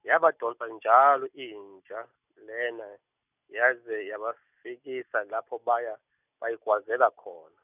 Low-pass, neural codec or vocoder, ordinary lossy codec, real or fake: 3.6 kHz; none; none; real